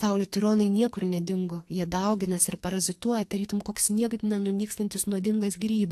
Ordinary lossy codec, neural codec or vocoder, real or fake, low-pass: AAC, 64 kbps; codec, 44.1 kHz, 2.6 kbps, SNAC; fake; 14.4 kHz